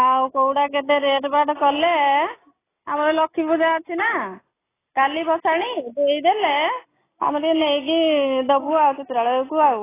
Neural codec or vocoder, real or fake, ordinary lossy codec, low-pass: none; real; AAC, 16 kbps; 3.6 kHz